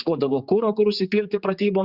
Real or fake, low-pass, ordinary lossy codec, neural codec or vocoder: fake; 5.4 kHz; Opus, 64 kbps; codec, 16 kHz, 4 kbps, X-Codec, HuBERT features, trained on general audio